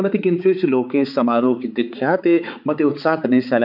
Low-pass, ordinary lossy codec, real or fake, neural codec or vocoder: 5.4 kHz; none; fake; codec, 16 kHz, 4 kbps, X-Codec, HuBERT features, trained on balanced general audio